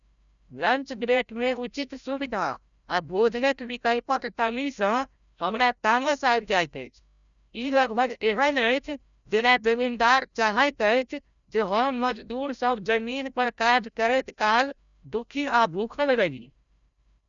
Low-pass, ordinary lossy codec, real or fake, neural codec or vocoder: 7.2 kHz; none; fake; codec, 16 kHz, 0.5 kbps, FreqCodec, larger model